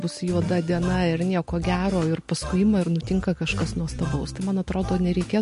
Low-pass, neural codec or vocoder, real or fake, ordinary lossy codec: 10.8 kHz; none; real; MP3, 48 kbps